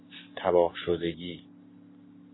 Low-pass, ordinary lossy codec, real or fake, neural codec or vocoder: 7.2 kHz; AAC, 16 kbps; real; none